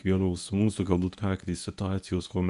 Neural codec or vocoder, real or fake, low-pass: codec, 24 kHz, 0.9 kbps, WavTokenizer, medium speech release version 1; fake; 10.8 kHz